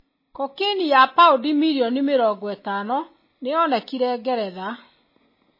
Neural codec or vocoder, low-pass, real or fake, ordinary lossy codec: none; 5.4 kHz; real; MP3, 24 kbps